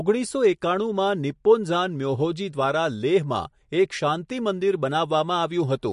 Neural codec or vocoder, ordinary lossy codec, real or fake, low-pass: none; MP3, 48 kbps; real; 14.4 kHz